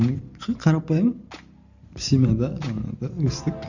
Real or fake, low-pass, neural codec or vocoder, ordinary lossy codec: fake; 7.2 kHz; vocoder, 44.1 kHz, 128 mel bands every 512 samples, BigVGAN v2; none